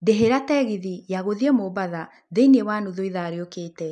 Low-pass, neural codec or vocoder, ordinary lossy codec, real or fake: none; none; none; real